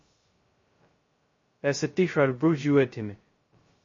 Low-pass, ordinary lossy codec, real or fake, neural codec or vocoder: 7.2 kHz; MP3, 32 kbps; fake; codec, 16 kHz, 0.2 kbps, FocalCodec